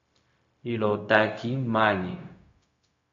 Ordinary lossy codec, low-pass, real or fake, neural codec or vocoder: MP3, 48 kbps; 7.2 kHz; fake; codec, 16 kHz, 0.4 kbps, LongCat-Audio-Codec